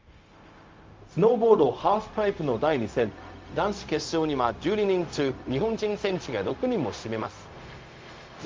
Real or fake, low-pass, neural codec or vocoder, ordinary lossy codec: fake; 7.2 kHz; codec, 16 kHz, 0.4 kbps, LongCat-Audio-Codec; Opus, 32 kbps